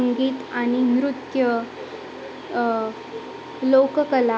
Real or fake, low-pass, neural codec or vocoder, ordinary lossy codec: real; none; none; none